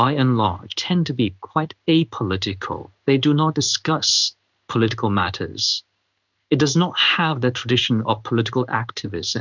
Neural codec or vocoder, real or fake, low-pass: codec, 16 kHz in and 24 kHz out, 1 kbps, XY-Tokenizer; fake; 7.2 kHz